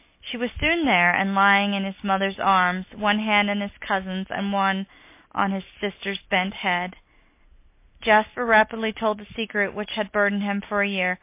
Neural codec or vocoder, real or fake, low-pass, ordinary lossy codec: none; real; 3.6 kHz; MP3, 24 kbps